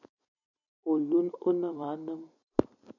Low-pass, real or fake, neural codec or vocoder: 7.2 kHz; fake; vocoder, 44.1 kHz, 128 mel bands every 256 samples, BigVGAN v2